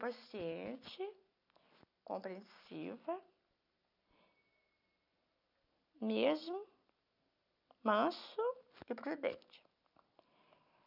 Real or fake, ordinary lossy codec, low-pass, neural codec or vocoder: real; none; 5.4 kHz; none